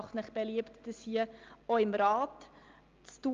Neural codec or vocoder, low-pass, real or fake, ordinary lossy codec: none; 7.2 kHz; real; Opus, 32 kbps